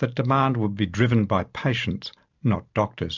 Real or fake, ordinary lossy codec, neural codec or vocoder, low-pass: real; MP3, 64 kbps; none; 7.2 kHz